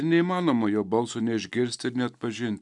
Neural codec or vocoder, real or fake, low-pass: none; real; 10.8 kHz